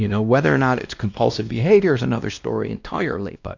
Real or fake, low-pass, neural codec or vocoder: fake; 7.2 kHz; codec, 16 kHz, 1 kbps, X-Codec, WavLM features, trained on Multilingual LibriSpeech